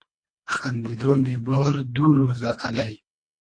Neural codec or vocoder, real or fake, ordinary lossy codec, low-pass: codec, 24 kHz, 1.5 kbps, HILCodec; fake; AAC, 48 kbps; 9.9 kHz